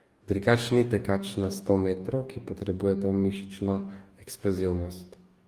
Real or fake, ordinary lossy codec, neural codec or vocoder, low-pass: fake; Opus, 32 kbps; codec, 44.1 kHz, 2.6 kbps, DAC; 14.4 kHz